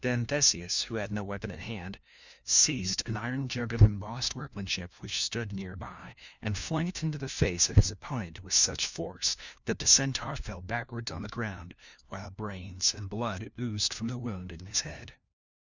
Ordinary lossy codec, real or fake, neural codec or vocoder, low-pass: Opus, 64 kbps; fake; codec, 16 kHz, 1 kbps, FunCodec, trained on LibriTTS, 50 frames a second; 7.2 kHz